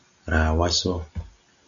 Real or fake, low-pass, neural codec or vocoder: real; 7.2 kHz; none